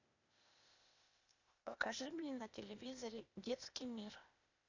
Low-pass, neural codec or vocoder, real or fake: 7.2 kHz; codec, 16 kHz, 0.8 kbps, ZipCodec; fake